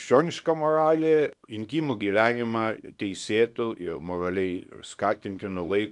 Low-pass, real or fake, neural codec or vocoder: 10.8 kHz; fake; codec, 24 kHz, 0.9 kbps, WavTokenizer, small release